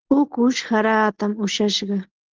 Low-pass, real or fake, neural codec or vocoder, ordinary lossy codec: 7.2 kHz; real; none; Opus, 16 kbps